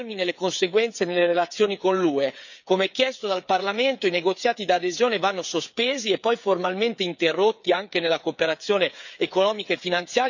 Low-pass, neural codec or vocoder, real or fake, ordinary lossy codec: 7.2 kHz; codec, 16 kHz, 8 kbps, FreqCodec, smaller model; fake; none